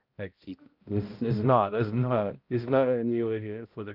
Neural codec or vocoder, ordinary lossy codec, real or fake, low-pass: codec, 16 kHz, 0.5 kbps, X-Codec, HuBERT features, trained on balanced general audio; Opus, 32 kbps; fake; 5.4 kHz